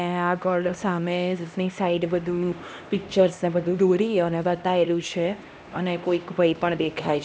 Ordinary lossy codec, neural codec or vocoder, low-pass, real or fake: none; codec, 16 kHz, 1 kbps, X-Codec, HuBERT features, trained on LibriSpeech; none; fake